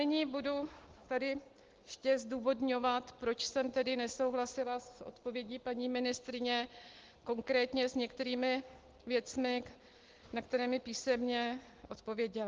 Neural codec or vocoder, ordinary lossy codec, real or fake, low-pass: none; Opus, 16 kbps; real; 7.2 kHz